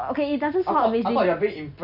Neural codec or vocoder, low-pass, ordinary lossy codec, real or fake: none; 5.4 kHz; none; real